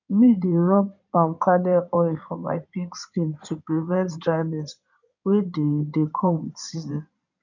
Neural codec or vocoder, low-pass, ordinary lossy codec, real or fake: codec, 16 kHz in and 24 kHz out, 2.2 kbps, FireRedTTS-2 codec; 7.2 kHz; none; fake